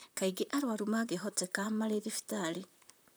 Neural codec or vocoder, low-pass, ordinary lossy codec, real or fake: vocoder, 44.1 kHz, 128 mel bands, Pupu-Vocoder; none; none; fake